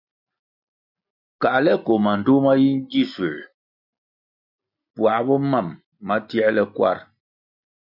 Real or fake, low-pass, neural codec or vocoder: real; 5.4 kHz; none